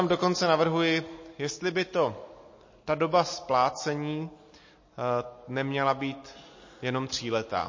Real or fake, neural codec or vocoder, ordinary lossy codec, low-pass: real; none; MP3, 32 kbps; 7.2 kHz